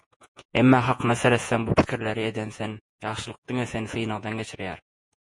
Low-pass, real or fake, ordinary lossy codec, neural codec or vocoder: 10.8 kHz; fake; MP3, 48 kbps; vocoder, 48 kHz, 128 mel bands, Vocos